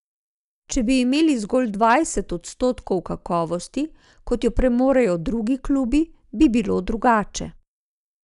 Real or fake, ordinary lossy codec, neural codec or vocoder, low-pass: real; none; none; 10.8 kHz